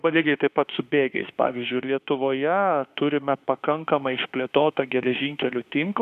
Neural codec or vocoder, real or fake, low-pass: autoencoder, 48 kHz, 32 numbers a frame, DAC-VAE, trained on Japanese speech; fake; 14.4 kHz